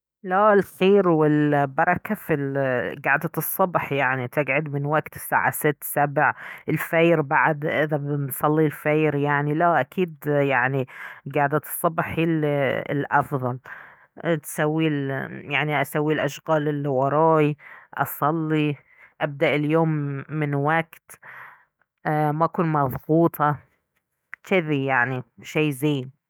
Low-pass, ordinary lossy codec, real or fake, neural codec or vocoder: none; none; fake; autoencoder, 48 kHz, 128 numbers a frame, DAC-VAE, trained on Japanese speech